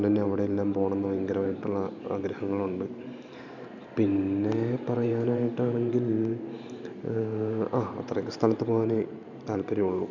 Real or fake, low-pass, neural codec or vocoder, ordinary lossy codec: fake; 7.2 kHz; vocoder, 44.1 kHz, 128 mel bands every 512 samples, BigVGAN v2; none